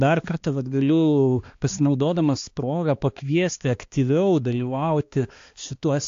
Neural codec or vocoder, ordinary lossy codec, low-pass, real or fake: codec, 16 kHz, 2 kbps, X-Codec, HuBERT features, trained on balanced general audio; AAC, 48 kbps; 7.2 kHz; fake